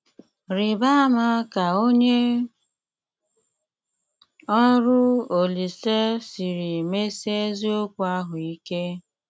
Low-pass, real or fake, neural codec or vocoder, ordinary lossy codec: none; real; none; none